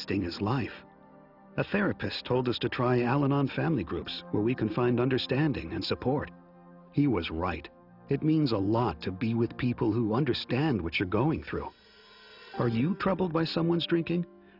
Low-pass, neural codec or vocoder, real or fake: 5.4 kHz; none; real